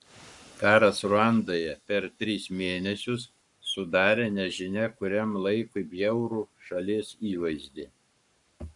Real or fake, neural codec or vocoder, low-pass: fake; codec, 44.1 kHz, 7.8 kbps, Pupu-Codec; 10.8 kHz